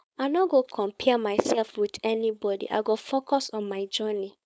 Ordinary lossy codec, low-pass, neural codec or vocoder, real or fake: none; none; codec, 16 kHz, 4.8 kbps, FACodec; fake